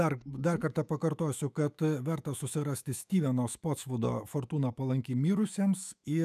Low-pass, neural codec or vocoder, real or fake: 14.4 kHz; autoencoder, 48 kHz, 128 numbers a frame, DAC-VAE, trained on Japanese speech; fake